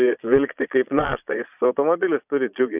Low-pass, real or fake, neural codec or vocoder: 3.6 kHz; fake; vocoder, 22.05 kHz, 80 mel bands, Vocos